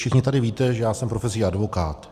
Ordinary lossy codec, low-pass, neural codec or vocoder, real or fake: Opus, 64 kbps; 14.4 kHz; none; real